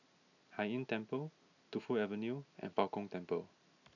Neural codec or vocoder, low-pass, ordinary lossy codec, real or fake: none; 7.2 kHz; none; real